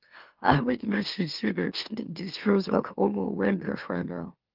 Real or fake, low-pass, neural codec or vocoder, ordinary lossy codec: fake; 5.4 kHz; autoencoder, 44.1 kHz, a latent of 192 numbers a frame, MeloTTS; Opus, 24 kbps